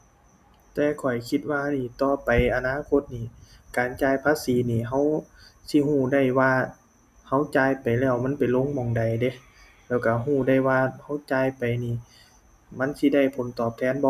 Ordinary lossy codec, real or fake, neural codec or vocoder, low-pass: none; real; none; 14.4 kHz